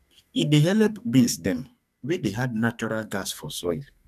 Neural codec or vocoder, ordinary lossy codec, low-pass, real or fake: codec, 44.1 kHz, 2.6 kbps, SNAC; none; 14.4 kHz; fake